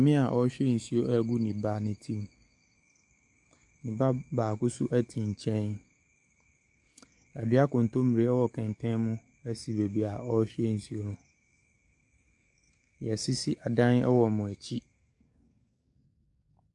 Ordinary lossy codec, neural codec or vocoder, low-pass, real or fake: AAC, 64 kbps; codec, 44.1 kHz, 7.8 kbps, DAC; 10.8 kHz; fake